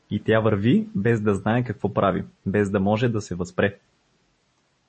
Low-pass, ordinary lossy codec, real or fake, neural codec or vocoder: 10.8 kHz; MP3, 32 kbps; real; none